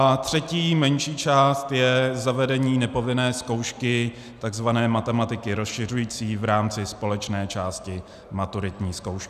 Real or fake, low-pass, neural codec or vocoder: real; 14.4 kHz; none